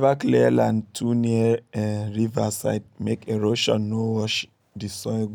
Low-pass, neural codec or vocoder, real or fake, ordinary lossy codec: 19.8 kHz; none; real; none